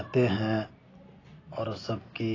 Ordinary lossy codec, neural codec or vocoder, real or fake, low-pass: AAC, 32 kbps; none; real; 7.2 kHz